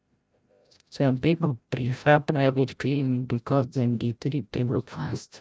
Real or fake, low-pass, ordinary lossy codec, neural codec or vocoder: fake; none; none; codec, 16 kHz, 0.5 kbps, FreqCodec, larger model